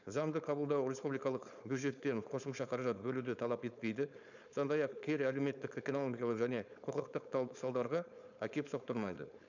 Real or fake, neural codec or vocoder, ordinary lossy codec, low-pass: fake; codec, 16 kHz, 4.8 kbps, FACodec; none; 7.2 kHz